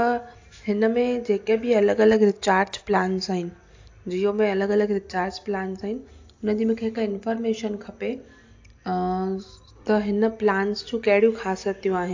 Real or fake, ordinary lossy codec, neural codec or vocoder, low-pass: real; AAC, 48 kbps; none; 7.2 kHz